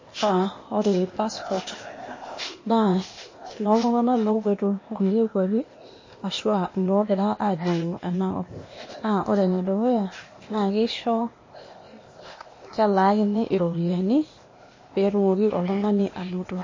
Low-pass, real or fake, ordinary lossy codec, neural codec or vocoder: 7.2 kHz; fake; MP3, 32 kbps; codec, 16 kHz, 0.8 kbps, ZipCodec